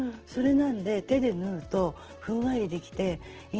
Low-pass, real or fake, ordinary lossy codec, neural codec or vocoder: 7.2 kHz; real; Opus, 16 kbps; none